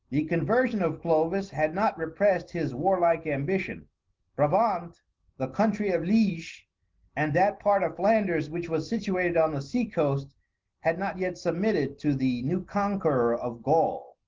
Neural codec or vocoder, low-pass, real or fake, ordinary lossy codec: none; 7.2 kHz; real; Opus, 16 kbps